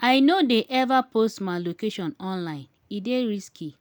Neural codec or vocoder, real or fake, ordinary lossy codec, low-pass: none; real; none; none